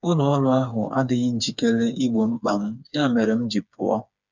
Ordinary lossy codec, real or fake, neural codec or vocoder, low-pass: none; fake; codec, 16 kHz, 4 kbps, FreqCodec, smaller model; 7.2 kHz